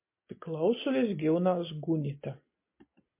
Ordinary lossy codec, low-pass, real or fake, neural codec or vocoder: MP3, 24 kbps; 3.6 kHz; real; none